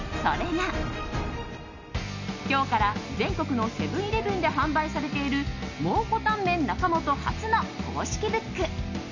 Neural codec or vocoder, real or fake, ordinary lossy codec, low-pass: none; real; none; 7.2 kHz